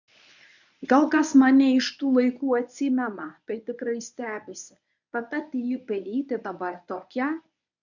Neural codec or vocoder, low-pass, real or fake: codec, 24 kHz, 0.9 kbps, WavTokenizer, medium speech release version 1; 7.2 kHz; fake